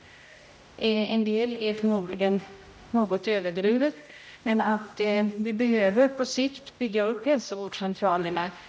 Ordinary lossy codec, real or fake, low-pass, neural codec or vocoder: none; fake; none; codec, 16 kHz, 0.5 kbps, X-Codec, HuBERT features, trained on general audio